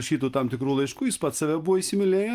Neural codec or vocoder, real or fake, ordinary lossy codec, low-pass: none; real; Opus, 32 kbps; 14.4 kHz